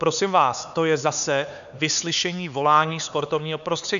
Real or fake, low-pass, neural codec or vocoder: fake; 7.2 kHz; codec, 16 kHz, 4 kbps, X-Codec, HuBERT features, trained on LibriSpeech